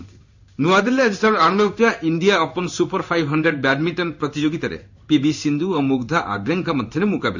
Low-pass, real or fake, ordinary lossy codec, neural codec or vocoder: 7.2 kHz; fake; none; codec, 16 kHz in and 24 kHz out, 1 kbps, XY-Tokenizer